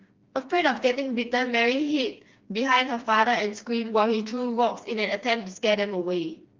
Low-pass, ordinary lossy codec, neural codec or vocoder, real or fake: 7.2 kHz; Opus, 24 kbps; codec, 16 kHz, 2 kbps, FreqCodec, smaller model; fake